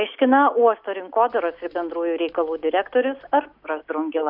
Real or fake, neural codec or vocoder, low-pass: real; none; 5.4 kHz